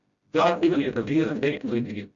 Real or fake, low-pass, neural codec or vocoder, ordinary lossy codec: fake; 7.2 kHz; codec, 16 kHz, 0.5 kbps, FreqCodec, smaller model; Opus, 64 kbps